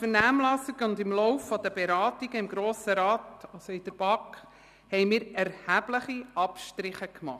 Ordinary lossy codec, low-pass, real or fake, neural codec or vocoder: none; 14.4 kHz; real; none